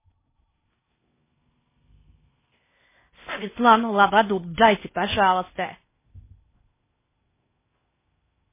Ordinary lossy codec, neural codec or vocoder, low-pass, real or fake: MP3, 16 kbps; codec, 16 kHz in and 24 kHz out, 0.6 kbps, FocalCodec, streaming, 4096 codes; 3.6 kHz; fake